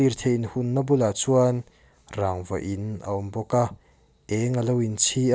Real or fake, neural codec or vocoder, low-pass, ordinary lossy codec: real; none; none; none